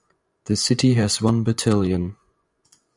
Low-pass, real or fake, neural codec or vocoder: 10.8 kHz; fake; vocoder, 24 kHz, 100 mel bands, Vocos